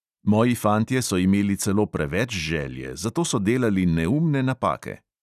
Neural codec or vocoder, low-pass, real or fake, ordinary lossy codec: none; 14.4 kHz; real; none